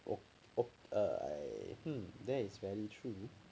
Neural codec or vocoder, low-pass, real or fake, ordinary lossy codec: none; none; real; none